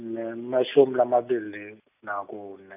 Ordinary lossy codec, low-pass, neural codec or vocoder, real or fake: none; 3.6 kHz; none; real